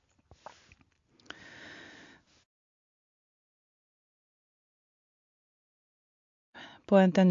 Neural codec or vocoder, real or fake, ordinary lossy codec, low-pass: none; real; AAC, 48 kbps; 7.2 kHz